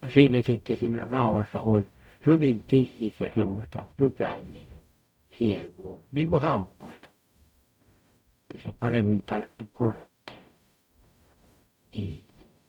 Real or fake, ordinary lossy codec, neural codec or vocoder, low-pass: fake; none; codec, 44.1 kHz, 0.9 kbps, DAC; 19.8 kHz